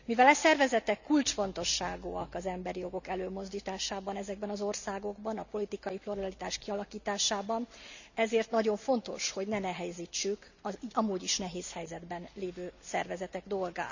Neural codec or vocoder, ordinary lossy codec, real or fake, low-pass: none; none; real; 7.2 kHz